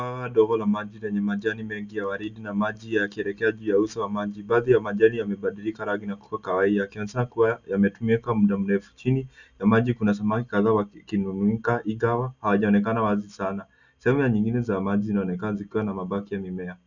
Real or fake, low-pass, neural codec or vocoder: real; 7.2 kHz; none